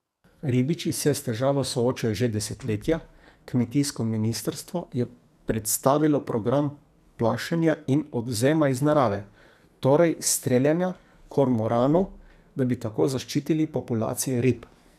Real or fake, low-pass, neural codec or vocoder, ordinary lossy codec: fake; 14.4 kHz; codec, 44.1 kHz, 2.6 kbps, SNAC; none